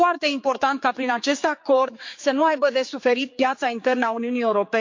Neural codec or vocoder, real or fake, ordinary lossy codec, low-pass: codec, 16 kHz, 4 kbps, X-Codec, HuBERT features, trained on general audio; fake; MP3, 48 kbps; 7.2 kHz